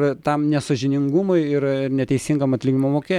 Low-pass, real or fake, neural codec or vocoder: 19.8 kHz; real; none